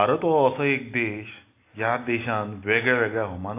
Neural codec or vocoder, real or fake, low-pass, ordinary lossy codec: none; real; 3.6 kHz; AAC, 24 kbps